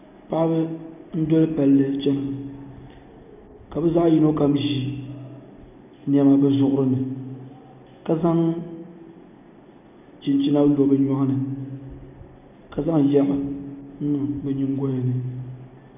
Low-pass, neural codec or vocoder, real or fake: 3.6 kHz; none; real